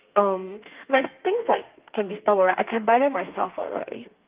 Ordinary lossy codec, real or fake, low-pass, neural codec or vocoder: Opus, 32 kbps; fake; 3.6 kHz; codec, 32 kHz, 1.9 kbps, SNAC